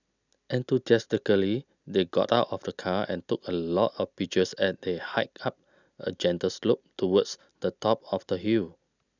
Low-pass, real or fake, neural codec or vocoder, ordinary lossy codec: 7.2 kHz; real; none; none